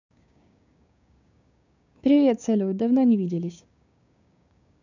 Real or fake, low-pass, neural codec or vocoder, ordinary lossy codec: fake; 7.2 kHz; codec, 16 kHz, 6 kbps, DAC; none